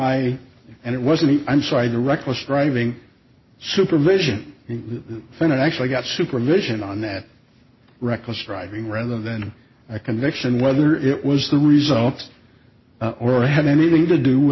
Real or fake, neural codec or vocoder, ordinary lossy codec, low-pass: real; none; MP3, 24 kbps; 7.2 kHz